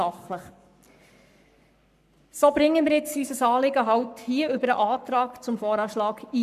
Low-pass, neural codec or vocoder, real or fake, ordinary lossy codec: 14.4 kHz; codec, 44.1 kHz, 7.8 kbps, Pupu-Codec; fake; none